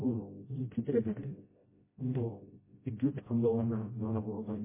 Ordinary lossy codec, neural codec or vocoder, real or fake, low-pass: MP3, 16 kbps; codec, 16 kHz, 0.5 kbps, FreqCodec, smaller model; fake; 3.6 kHz